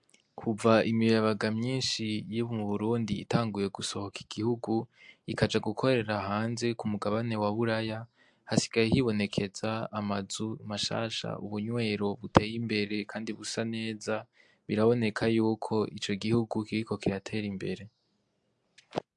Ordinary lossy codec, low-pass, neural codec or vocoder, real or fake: MP3, 64 kbps; 10.8 kHz; none; real